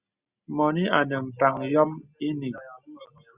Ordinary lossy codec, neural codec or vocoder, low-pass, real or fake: Opus, 64 kbps; none; 3.6 kHz; real